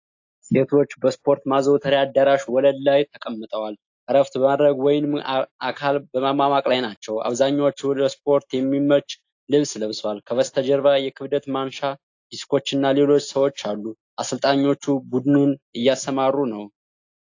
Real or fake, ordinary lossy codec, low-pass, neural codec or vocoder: real; AAC, 48 kbps; 7.2 kHz; none